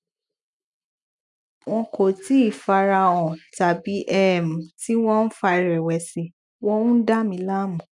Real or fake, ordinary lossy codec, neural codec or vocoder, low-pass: real; none; none; 10.8 kHz